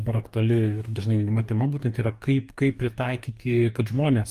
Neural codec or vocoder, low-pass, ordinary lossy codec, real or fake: codec, 44.1 kHz, 2.6 kbps, DAC; 14.4 kHz; Opus, 32 kbps; fake